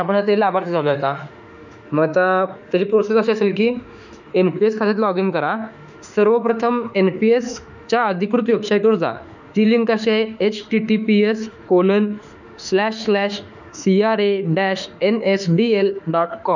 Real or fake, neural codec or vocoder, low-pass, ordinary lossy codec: fake; autoencoder, 48 kHz, 32 numbers a frame, DAC-VAE, trained on Japanese speech; 7.2 kHz; none